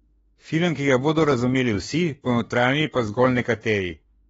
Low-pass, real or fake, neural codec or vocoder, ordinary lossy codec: 19.8 kHz; fake; autoencoder, 48 kHz, 32 numbers a frame, DAC-VAE, trained on Japanese speech; AAC, 24 kbps